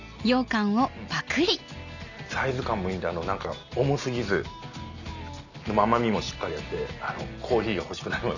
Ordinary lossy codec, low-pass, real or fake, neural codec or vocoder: none; 7.2 kHz; real; none